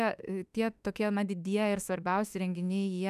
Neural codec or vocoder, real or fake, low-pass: autoencoder, 48 kHz, 32 numbers a frame, DAC-VAE, trained on Japanese speech; fake; 14.4 kHz